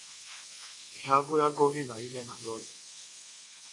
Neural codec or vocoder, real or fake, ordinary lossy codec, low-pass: codec, 24 kHz, 1.2 kbps, DualCodec; fake; AAC, 32 kbps; 10.8 kHz